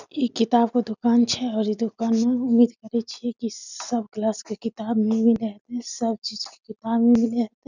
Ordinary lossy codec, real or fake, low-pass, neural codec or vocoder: none; real; 7.2 kHz; none